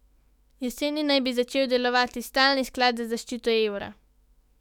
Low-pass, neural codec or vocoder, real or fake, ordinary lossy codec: 19.8 kHz; autoencoder, 48 kHz, 128 numbers a frame, DAC-VAE, trained on Japanese speech; fake; none